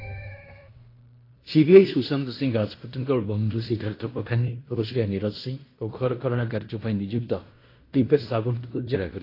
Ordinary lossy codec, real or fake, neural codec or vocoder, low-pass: AAC, 32 kbps; fake; codec, 16 kHz in and 24 kHz out, 0.9 kbps, LongCat-Audio-Codec, fine tuned four codebook decoder; 5.4 kHz